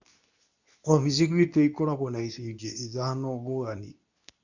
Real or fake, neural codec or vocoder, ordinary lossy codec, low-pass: fake; codec, 24 kHz, 0.9 kbps, WavTokenizer, medium speech release version 2; none; 7.2 kHz